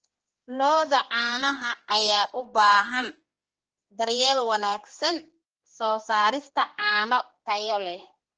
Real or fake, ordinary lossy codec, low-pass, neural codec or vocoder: fake; Opus, 16 kbps; 7.2 kHz; codec, 16 kHz, 2 kbps, X-Codec, HuBERT features, trained on general audio